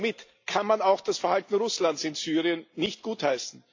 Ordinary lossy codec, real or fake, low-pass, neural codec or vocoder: AAC, 48 kbps; real; 7.2 kHz; none